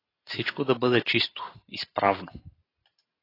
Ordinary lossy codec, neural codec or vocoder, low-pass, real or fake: AAC, 24 kbps; none; 5.4 kHz; real